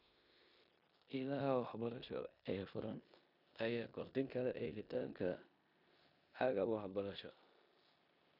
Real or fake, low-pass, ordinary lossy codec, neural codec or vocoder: fake; 5.4 kHz; none; codec, 16 kHz in and 24 kHz out, 0.9 kbps, LongCat-Audio-Codec, four codebook decoder